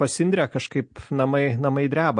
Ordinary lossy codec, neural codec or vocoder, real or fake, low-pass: MP3, 48 kbps; none; real; 9.9 kHz